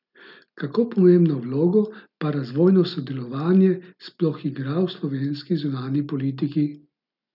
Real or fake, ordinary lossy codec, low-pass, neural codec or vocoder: real; none; 5.4 kHz; none